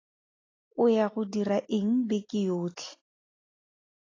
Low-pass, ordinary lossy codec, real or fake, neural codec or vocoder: 7.2 kHz; AAC, 32 kbps; real; none